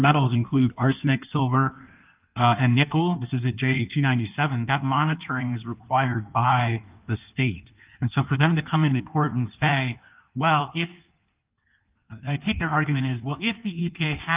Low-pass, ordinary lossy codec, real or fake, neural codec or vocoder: 3.6 kHz; Opus, 24 kbps; fake; codec, 16 kHz in and 24 kHz out, 1.1 kbps, FireRedTTS-2 codec